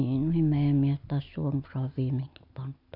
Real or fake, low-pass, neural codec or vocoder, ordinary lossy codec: real; 5.4 kHz; none; none